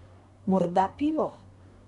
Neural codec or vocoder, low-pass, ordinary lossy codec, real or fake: codec, 24 kHz, 1 kbps, SNAC; 10.8 kHz; none; fake